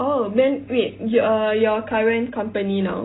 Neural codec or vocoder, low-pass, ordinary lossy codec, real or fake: none; 7.2 kHz; AAC, 16 kbps; real